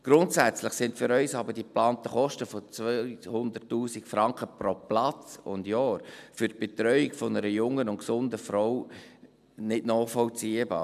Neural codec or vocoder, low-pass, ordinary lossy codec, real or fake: none; 14.4 kHz; none; real